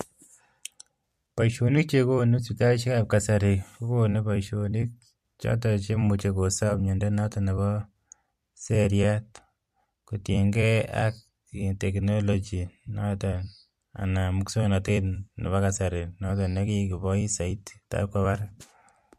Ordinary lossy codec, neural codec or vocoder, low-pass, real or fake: MP3, 64 kbps; vocoder, 44.1 kHz, 128 mel bands every 256 samples, BigVGAN v2; 14.4 kHz; fake